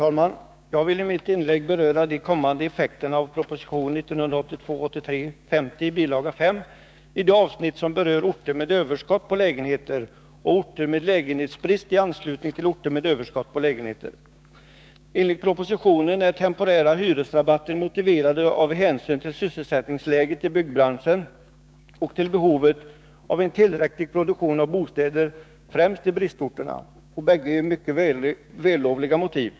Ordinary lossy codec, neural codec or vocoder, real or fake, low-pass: none; codec, 16 kHz, 6 kbps, DAC; fake; none